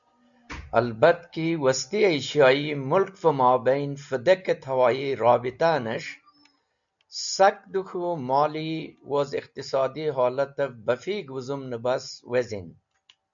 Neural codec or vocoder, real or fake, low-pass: none; real; 7.2 kHz